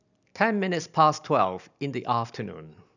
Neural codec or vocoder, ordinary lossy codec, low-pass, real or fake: none; none; 7.2 kHz; real